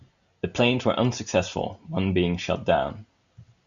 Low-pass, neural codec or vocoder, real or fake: 7.2 kHz; none; real